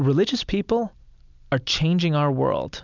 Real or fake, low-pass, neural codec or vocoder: real; 7.2 kHz; none